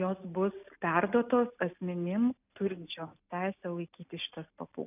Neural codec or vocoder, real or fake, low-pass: none; real; 3.6 kHz